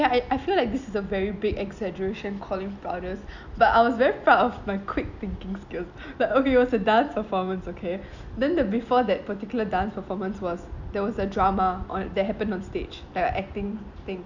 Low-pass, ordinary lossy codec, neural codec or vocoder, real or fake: 7.2 kHz; none; none; real